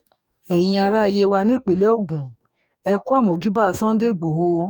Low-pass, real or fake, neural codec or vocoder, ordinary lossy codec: 19.8 kHz; fake; codec, 44.1 kHz, 2.6 kbps, DAC; none